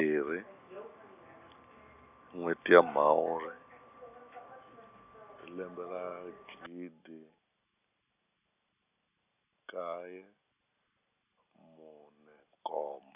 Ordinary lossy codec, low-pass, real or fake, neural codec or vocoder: none; 3.6 kHz; real; none